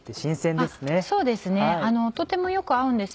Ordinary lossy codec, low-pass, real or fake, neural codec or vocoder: none; none; real; none